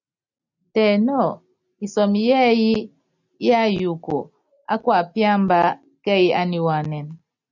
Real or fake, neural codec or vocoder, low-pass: real; none; 7.2 kHz